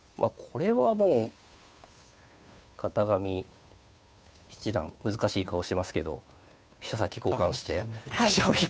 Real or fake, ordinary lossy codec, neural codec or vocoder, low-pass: fake; none; codec, 16 kHz, 2 kbps, FunCodec, trained on Chinese and English, 25 frames a second; none